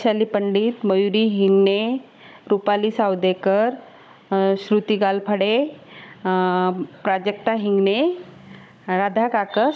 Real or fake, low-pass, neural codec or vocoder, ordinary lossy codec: fake; none; codec, 16 kHz, 16 kbps, FunCodec, trained on Chinese and English, 50 frames a second; none